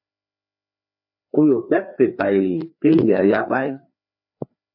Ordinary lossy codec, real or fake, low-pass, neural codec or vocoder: MP3, 32 kbps; fake; 5.4 kHz; codec, 16 kHz, 2 kbps, FreqCodec, larger model